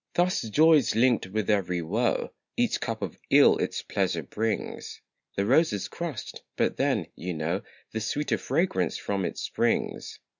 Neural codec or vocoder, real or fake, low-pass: none; real; 7.2 kHz